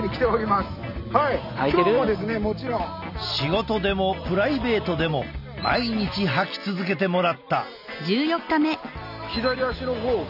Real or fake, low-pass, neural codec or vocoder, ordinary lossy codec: real; 5.4 kHz; none; none